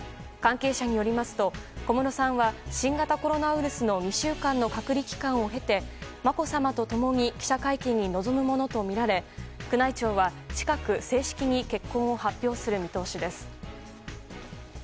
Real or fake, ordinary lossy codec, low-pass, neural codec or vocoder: real; none; none; none